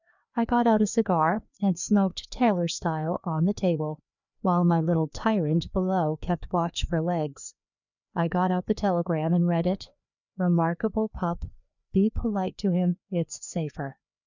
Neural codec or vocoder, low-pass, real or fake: codec, 16 kHz, 2 kbps, FreqCodec, larger model; 7.2 kHz; fake